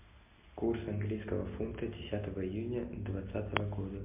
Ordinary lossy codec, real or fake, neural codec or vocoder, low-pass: MP3, 32 kbps; real; none; 3.6 kHz